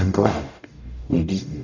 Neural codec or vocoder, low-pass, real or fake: codec, 44.1 kHz, 0.9 kbps, DAC; 7.2 kHz; fake